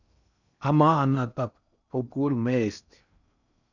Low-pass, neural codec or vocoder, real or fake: 7.2 kHz; codec, 16 kHz in and 24 kHz out, 0.8 kbps, FocalCodec, streaming, 65536 codes; fake